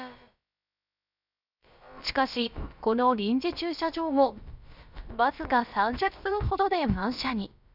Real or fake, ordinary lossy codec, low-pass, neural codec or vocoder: fake; none; 5.4 kHz; codec, 16 kHz, about 1 kbps, DyCAST, with the encoder's durations